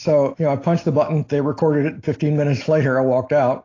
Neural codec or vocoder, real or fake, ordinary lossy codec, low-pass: none; real; AAC, 32 kbps; 7.2 kHz